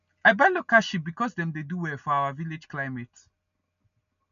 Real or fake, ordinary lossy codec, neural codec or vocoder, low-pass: real; none; none; 7.2 kHz